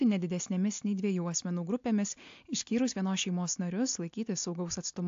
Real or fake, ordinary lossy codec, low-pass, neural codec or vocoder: real; AAC, 48 kbps; 7.2 kHz; none